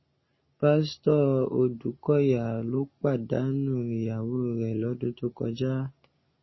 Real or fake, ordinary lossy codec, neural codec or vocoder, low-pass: real; MP3, 24 kbps; none; 7.2 kHz